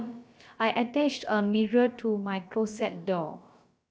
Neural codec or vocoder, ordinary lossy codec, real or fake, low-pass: codec, 16 kHz, about 1 kbps, DyCAST, with the encoder's durations; none; fake; none